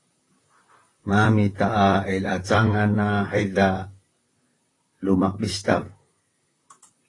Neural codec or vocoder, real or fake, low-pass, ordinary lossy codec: vocoder, 44.1 kHz, 128 mel bands, Pupu-Vocoder; fake; 10.8 kHz; AAC, 32 kbps